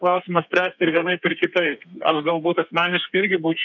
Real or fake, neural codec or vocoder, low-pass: fake; codec, 32 kHz, 1.9 kbps, SNAC; 7.2 kHz